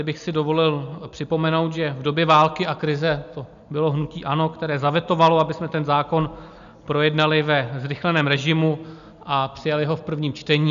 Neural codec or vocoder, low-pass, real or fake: none; 7.2 kHz; real